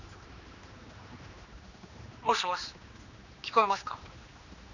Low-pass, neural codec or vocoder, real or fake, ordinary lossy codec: 7.2 kHz; codec, 16 kHz, 2 kbps, X-Codec, HuBERT features, trained on general audio; fake; none